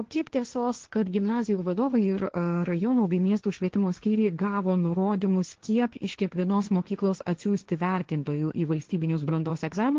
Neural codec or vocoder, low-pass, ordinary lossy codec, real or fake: codec, 16 kHz, 1.1 kbps, Voila-Tokenizer; 7.2 kHz; Opus, 16 kbps; fake